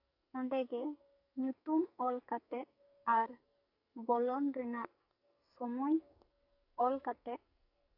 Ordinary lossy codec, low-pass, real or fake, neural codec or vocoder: none; 5.4 kHz; fake; codec, 44.1 kHz, 2.6 kbps, SNAC